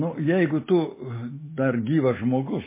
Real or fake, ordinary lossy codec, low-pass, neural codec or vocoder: real; MP3, 16 kbps; 3.6 kHz; none